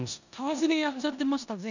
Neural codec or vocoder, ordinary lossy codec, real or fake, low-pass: codec, 16 kHz in and 24 kHz out, 0.9 kbps, LongCat-Audio-Codec, four codebook decoder; none; fake; 7.2 kHz